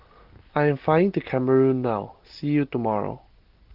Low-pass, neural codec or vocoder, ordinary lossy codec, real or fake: 5.4 kHz; none; Opus, 16 kbps; real